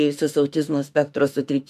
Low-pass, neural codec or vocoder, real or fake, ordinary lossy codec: 14.4 kHz; autoencoder, 48 kHz, 32 numbers a frame, DAC-VAE, trained on Japanese speech; fake; AAC, 96 kbps